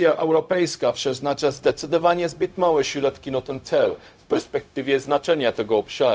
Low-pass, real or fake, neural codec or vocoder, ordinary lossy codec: none; fake; codec, 16 kHz, 0.4 kbps, LongCat-Audio-Codec; none